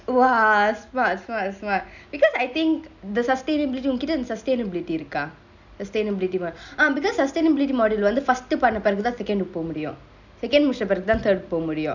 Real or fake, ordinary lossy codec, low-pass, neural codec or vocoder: real; none; 7.2 kHz; none